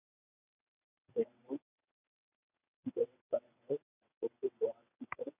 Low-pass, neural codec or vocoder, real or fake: 3.6 kHz; vocoder, 22.05 kHz, 80 mel bands, WaveNeXt; fake